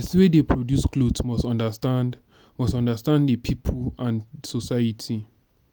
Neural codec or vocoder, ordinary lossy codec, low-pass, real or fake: none; none; none; real